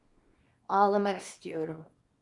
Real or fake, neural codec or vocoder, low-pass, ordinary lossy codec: fake; codec, 24 kHz, 0.9 kbps, WavTokenizer, small release; 10.8 kHz; Opus, 64 kbps